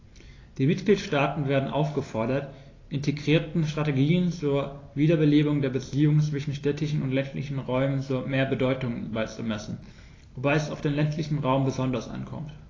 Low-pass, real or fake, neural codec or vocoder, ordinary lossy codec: 7.2 kHz; real; none; AAC, 32 kbps